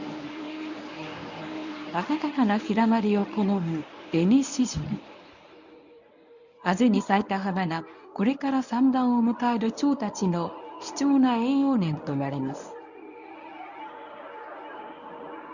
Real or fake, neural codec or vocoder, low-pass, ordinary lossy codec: fake; codec, 24 kHz, 0.9 kbps, WavTokenizer, medium speech release version 1; 7.2 kHz; none